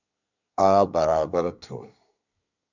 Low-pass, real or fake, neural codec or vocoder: 7.2 kHz; fake; codec, 24 kHz, 1 kbps, SNAC